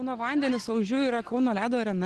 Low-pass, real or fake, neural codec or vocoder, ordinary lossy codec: 10.8 kHz; real; none; Opus, 16 kbps